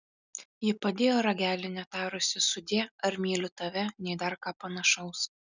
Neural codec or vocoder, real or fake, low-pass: none; real; 7.2 kHz